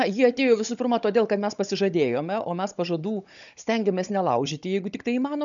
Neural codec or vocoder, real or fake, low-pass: codec, 16 kHz, 16 kbps, FunCodec, trained on Chinese and English, 50 frames a second; fake; 7.2 kHz